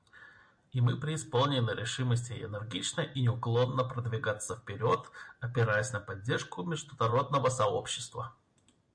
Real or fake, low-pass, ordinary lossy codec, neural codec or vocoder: real; 9.9 kHz; MP3, 64 kbps; none